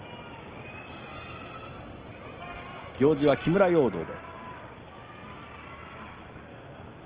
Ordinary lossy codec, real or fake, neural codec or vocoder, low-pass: Opus, 16 kbps; real; none; 3.6 kHz